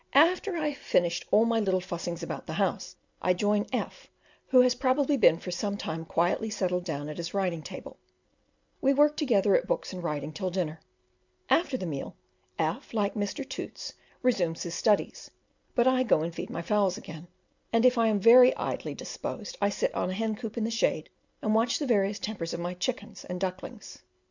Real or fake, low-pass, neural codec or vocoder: real; 7.2 kHz; none